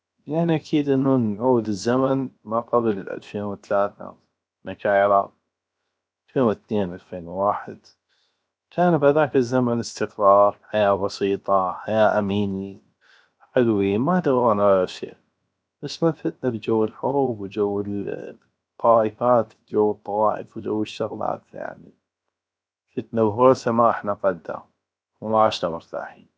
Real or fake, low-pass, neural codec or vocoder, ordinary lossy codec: fake; none; codec, 16 kHz, 0.7 kbps, FocalCodec; none